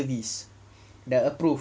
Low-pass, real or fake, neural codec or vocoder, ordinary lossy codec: none; real; none; none